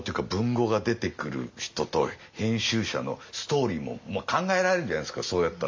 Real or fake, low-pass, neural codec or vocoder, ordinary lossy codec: real; 7.2 kHz; none; MP3, 48 kbps